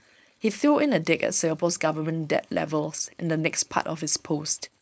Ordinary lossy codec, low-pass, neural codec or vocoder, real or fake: none; none; codec, 16 kHz, 4.8 kbps, FACodec; fake